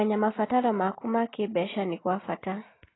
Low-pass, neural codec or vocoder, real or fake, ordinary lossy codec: 7.2 kHz; none; real; AAC, 16 kbps